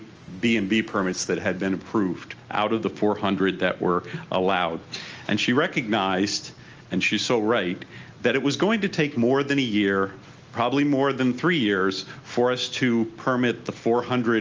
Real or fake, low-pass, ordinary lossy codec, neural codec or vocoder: real; 7.2 kHz; Opus, 24 kbps; none